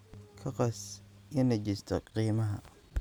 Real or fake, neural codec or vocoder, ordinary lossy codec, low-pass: real; none; none; none